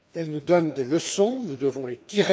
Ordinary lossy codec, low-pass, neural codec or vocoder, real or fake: none; none; codec, 16 kHz, 2 kbps, FreqCodec, larger model; fake